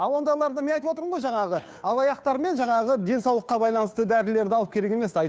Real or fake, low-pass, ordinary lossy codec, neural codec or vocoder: fake; none; none; codec, 16 kHz, 2 kbps, FunCodec, trained on Chinese and English, 25 frames a second